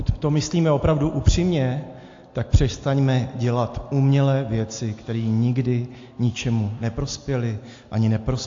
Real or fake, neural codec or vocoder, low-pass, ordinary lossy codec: real; none; 7.2 kHz; AAC, 48 kbps